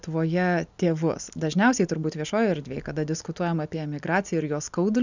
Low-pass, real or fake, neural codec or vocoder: 7.2 kHz; real; none